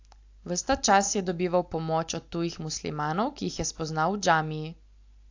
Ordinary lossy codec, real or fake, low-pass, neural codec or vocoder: AAC, 48 kbps; real; 7.2 kHz; none